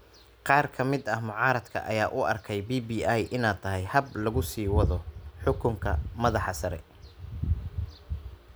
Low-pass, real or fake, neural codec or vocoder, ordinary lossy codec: none; real; none; none